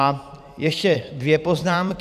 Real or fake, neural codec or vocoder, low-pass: fake; vocoder, 44.1 kHz, 128 mel bands every 256 samples, BigVGAN v2; 14.4 kHz